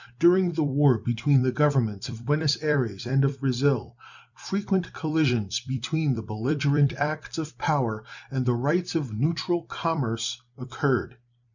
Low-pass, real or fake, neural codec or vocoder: 7.2 kHz; fake; vocoder, 44.1 kHz, 128 mel bands every 256 samples, BigVGAN v2